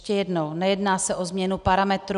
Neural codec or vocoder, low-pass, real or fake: none; 10.8 kHz; real